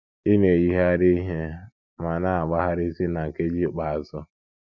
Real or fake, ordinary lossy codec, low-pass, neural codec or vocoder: real; none; 7.2 kHz; none